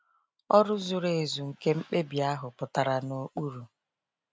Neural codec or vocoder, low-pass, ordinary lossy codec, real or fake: none; none; none; real